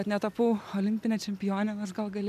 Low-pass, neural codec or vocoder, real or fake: 14.4 kHz; none; real